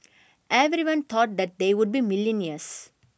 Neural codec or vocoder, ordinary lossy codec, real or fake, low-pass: none; none; real; none